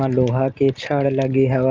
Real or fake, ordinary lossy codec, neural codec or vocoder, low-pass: fake; Opus, 24 kbps; vocoder, 44.1 kHz, 128 mel bands every 512 samples, BigVGAN v2; 7.2 kHz